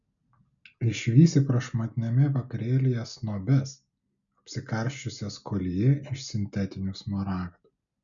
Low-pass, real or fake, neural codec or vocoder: 7.2 kHz; real; none